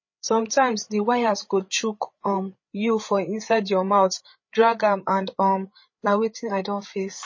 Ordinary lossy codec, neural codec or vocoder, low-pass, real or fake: MP3, 32 kbps; codec, 16 kHz, 8 kbps, FreqCodec, larger model; 7.2 kHz; fake